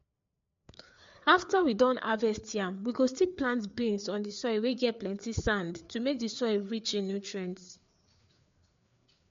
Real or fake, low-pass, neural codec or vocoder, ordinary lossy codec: fake; 7.2 kHz; codec, 16 kHz, 4 kbps, FreqCodec, larger model; MP3, 48 kbps